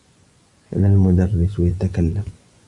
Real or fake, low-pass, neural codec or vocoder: fake; 10.8 kHz; vocoder, 24 kHz, 100 mel bands, Vocos